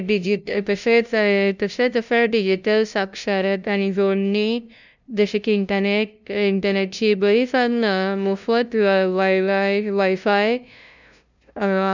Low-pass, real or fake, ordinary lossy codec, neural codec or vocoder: 7.2 kHz; fake; none; codec, 16 kHz, 0.5 kbps, FunCodec, trained on LibriTTS, 25 frames a second